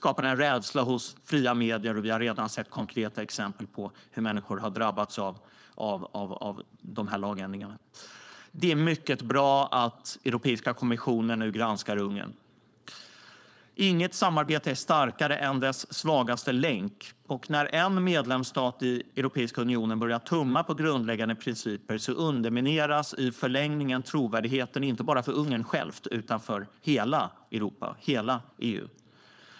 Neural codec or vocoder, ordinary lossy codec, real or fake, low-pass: codec, 16 kHz, 4.8 kbps, FACodec; none; fake; none